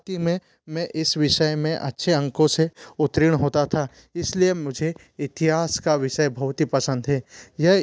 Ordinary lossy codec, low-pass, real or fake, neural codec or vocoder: none; none; real; none